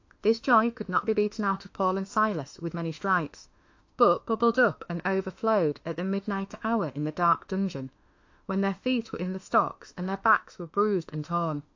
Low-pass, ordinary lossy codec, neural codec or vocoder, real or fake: 7.2 kHz; AAC, 48 kbps; autoencoder, 48 kHz, 32 numbers a frame, DAC-VAE, trained on Japanese speech; fake